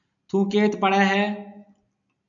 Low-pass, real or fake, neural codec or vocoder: 7.2 kHz; real; none